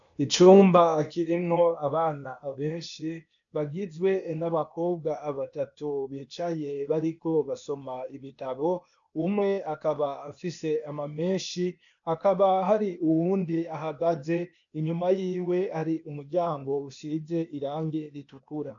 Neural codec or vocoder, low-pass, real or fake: codec, 16 kHz, 0.8 kbps, ZipCodec; 7.2 kHz; fake